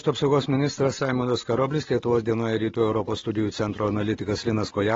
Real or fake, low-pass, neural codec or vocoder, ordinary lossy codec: real; 7.2 kHz; none; AAC, 24 kbps